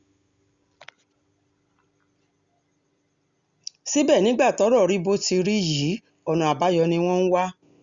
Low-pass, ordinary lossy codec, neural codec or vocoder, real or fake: 7.2 kHz; Opus, 64 kbps; none; real